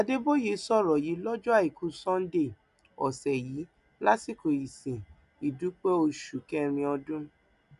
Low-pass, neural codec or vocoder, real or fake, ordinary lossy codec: 10.8 kHz; none; real; none